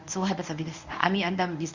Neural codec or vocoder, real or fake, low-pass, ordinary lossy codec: codec, 24 kHz, 0.9 kbps, WavTokenizer, medium speech release version 1; fake; 7.2 kHz; Opus, 64 kbps